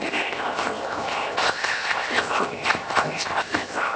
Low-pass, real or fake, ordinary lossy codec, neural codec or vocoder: none; fake; none; codec, 16 kHz, 0.7 kbps, FocalCodec